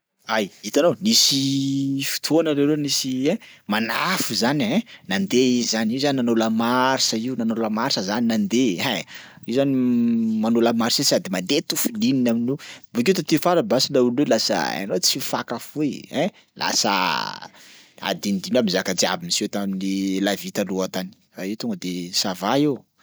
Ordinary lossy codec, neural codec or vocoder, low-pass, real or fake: none; none; none; real